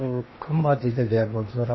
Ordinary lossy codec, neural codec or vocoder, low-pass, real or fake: MP3, 24 kbps; codec, 16 kHz in and 24 kHz out, 0.8 kbps, FocalCodec, streaming, 65536 codes; 7.2 kHz; fake